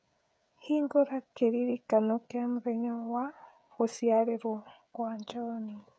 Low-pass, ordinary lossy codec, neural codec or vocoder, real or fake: none; none; codec, 16 kHz, 16 kbps, FreqCodec, smaller model; fake